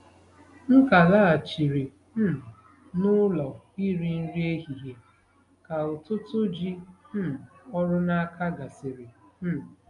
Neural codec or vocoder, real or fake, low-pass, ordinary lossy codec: none; real; 10.8 kHz; none